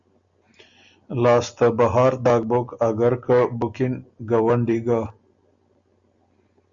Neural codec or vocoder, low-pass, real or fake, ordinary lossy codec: none; 7.2 kHz; real; Opus, 64 kbps